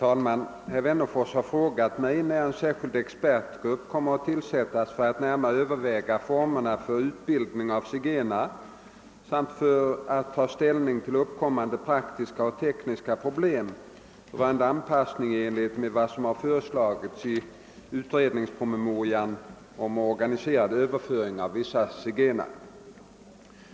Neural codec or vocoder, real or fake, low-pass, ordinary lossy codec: none; real; none; none